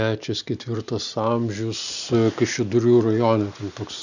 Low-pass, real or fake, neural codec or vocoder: 7.2 kHz; real; none